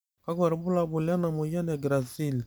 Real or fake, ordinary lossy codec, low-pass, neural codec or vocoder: real; none; none; none